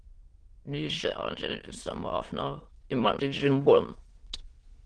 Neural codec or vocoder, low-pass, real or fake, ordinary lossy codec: autoencoder, 22.05 kHz, a latent of 192 numbers a frame, VITS, trained on many speakers; 9.9 kHz; fake; Opus, 16 kbps